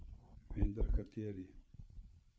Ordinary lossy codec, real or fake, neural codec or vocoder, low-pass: none; fake; codec, 16 kHz, 8 kbps, FreqCodec, larger model; none